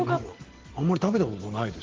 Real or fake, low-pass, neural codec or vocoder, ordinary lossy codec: real; 7.2 kHz; none; Opus, 16 kbps